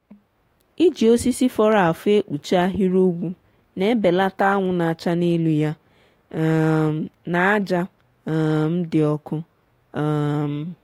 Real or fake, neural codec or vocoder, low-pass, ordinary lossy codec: fake; autoencoder, 48 kHz, 128 numbers a frame, DAC-VAE, trained on Japanese speech; 19.8 kHz; AAC, 48 kbps